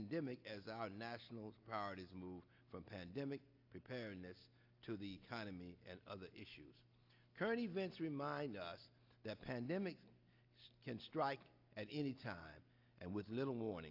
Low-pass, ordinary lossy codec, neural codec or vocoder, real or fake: 5.4 kHz; AAC, 48 kbps; none; real